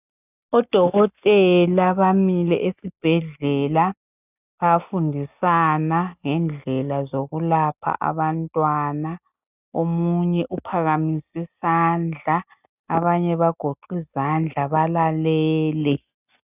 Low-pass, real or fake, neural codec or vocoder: 3.6 kHz; real; none